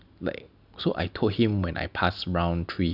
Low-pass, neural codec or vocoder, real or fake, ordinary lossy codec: 5.4 kHz; none; real; none